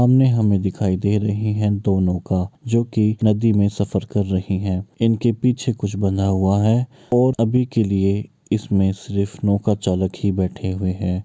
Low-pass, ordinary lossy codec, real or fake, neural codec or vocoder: none; none; real; none